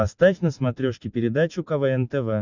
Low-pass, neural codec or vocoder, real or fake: 7.2 kHz; none; real